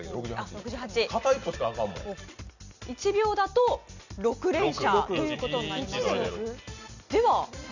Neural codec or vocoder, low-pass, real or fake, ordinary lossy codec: none; 7.2 kHz; real; none